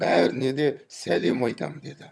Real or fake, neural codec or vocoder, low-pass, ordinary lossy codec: fake; vocoder, 22.05 kHz, 80 mel bands, HiFi-GAN; none; none